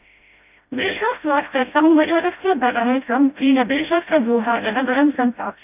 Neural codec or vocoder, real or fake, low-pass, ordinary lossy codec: codec, 16 kHz, 0.5 kbps, FreqCodec, smaller model; fake; 3.6 kHz; none